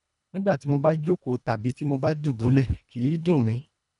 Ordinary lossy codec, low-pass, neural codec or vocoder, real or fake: none; 10.8 kHz; codec, 24 kHz, 1.5 kbps, HILCodec; fake